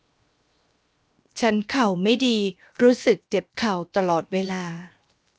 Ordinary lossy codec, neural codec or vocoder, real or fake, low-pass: none; codec, 16 kHz, 0.7 kbps, FocalCodec; fake; none